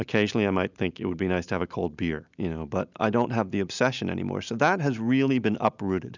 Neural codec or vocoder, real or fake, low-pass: none; real; 7.2 kHz